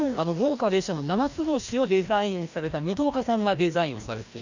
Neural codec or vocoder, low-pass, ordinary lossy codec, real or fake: codec, 16 kHz, 1 kbps, FreqCodec, larger model; 7.2 kHz; none; fake